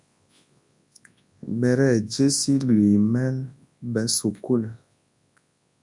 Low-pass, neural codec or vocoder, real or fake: 10.8 kHz; codec, 24 kHz, 0.9 kbps, WavTokenizer, large speech release; fake